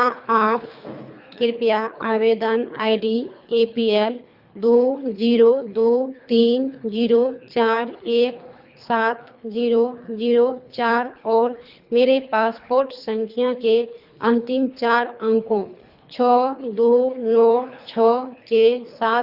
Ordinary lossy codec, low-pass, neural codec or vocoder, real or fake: Opus, 64 kbps; 5.4 kHz; codec, 24 kHz, 3 kbps, HILCodec; fake